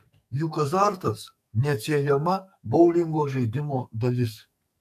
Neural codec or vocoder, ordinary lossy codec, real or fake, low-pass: codec, 44.1 kHz, 2.6 kbps, SNAC; AAC, 96 kbps; fake; 14.4 kHz